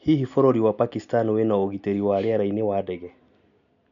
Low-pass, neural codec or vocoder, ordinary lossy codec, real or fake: 7.2 kHz; none; none; real